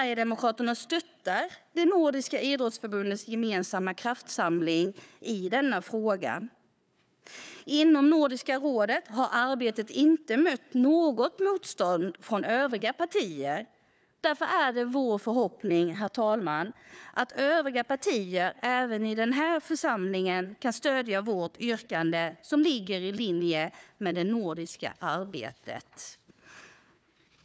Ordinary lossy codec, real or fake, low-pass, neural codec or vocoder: none; fake; none; codec, 16 kHz, 4 kbps, FunCodec, trained on Chinese and English, 50 frames a second